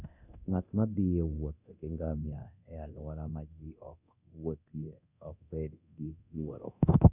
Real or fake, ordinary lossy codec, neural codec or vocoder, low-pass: fake; none; codec, 24 kHz, 0.9 kbps, DualCodec; 3.6 kHz